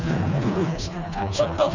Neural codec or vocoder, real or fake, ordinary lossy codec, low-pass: codec, 16 kHz, 2 kbps, FreqCodec, smaller model; fake; none; 7.2 kHz